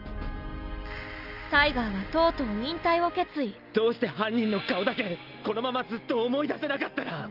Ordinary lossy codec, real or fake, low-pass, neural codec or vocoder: Opus, 32 kbps; real; 5.4 kHz; none